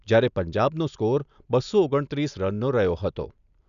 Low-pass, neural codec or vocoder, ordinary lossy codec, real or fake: 7.2 kHz; none; none; real